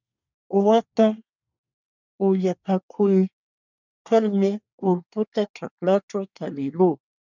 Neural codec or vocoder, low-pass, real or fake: codec, 24 kHz, 1 kbps, SNAC; 7.2 kHz; fake